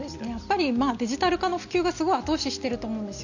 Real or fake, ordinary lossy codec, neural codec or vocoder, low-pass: real; none; none; 7.2 kHz